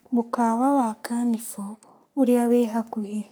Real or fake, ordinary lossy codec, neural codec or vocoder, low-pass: fake; none; codec, 44.1 kHz, 3.4 kbps, Pupu-Codec; none